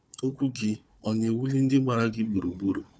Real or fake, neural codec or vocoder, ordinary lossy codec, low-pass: fake; codec, 16 kHz, 4 kbps, FunCodec, trained on Chinese and English, 50 frames a second; none; none